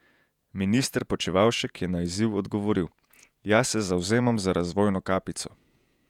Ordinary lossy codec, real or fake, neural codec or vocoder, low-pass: none; fake; vocoder, 48 kHz, 128 mel bands, Vocos; 19.8 kHz